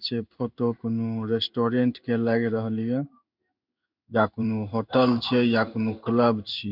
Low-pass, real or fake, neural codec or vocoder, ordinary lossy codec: 5.4 kHz; fake; codec, 16 kHz in and 24 kHz out, 1 kbps, XY-Tokenizer; none